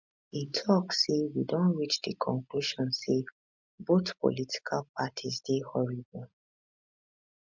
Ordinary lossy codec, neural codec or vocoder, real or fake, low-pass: MP3, 64 kbps; none; real; 7.2 kHz